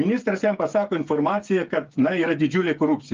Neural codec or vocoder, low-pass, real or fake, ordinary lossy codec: codec, 16 kHz, 8 kbps, FreqCodec, smaller model; 7.2 kHz; fake; Opus, 24 kbps